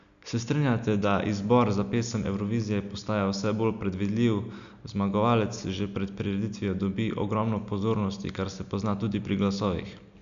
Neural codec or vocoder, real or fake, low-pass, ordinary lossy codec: none; real; 7.2 kHz; none